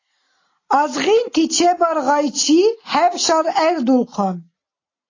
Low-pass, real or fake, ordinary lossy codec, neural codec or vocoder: 7.2 kHz; real; AAC, 32 kbps; none